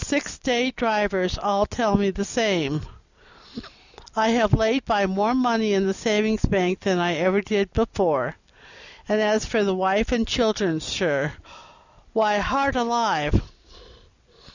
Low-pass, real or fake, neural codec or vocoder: 7.2 kHz; real; none